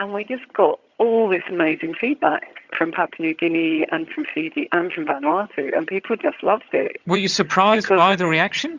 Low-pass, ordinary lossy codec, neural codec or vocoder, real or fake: 7.2 kHz; Opus, 64 kbps; vocoder, 22.05 kHz, 80 mel bands, HiFi-GAN; fake